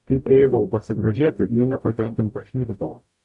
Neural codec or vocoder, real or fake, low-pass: codec, 44.1 kHz, 0.9 kbps, DAC; fake; 10.8 kHz